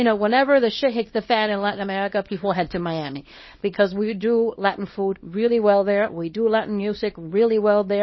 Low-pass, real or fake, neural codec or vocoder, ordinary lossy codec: 7.2 kHz; fake; codec, 24 kHz, 0.9 kbps, WavTokenizer, small release; MP3, 24 kbps